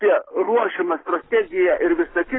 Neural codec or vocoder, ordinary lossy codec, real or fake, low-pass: none; AAC, 16 kbps; real; 7.2 kHz